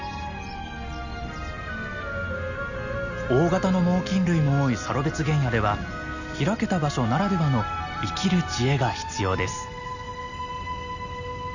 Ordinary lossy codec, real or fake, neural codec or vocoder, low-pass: none; real; none; 7.2 kHz